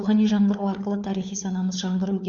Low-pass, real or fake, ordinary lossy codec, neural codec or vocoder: 7.2 kHz; fake; none; codec, 16 kHz, 4 kbps, FunCodec, trained on LibriTTS, 50 frames a second